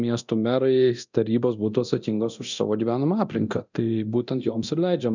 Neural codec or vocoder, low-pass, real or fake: codec, 24 kHz, 0.9 kbps, DualCodec; 7.2 kHz; fake